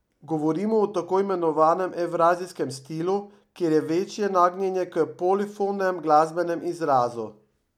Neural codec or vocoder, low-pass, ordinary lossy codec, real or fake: none; 19.8 kHz; none; real